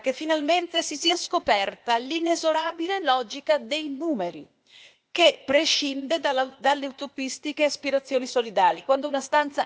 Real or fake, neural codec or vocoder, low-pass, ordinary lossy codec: fake; codec, 16 kHz, 0.8 kbps, ZipCodec; none; none